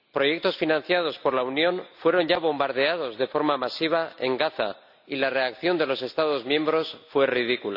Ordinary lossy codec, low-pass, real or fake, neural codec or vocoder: none; 5.4 kHz; real; none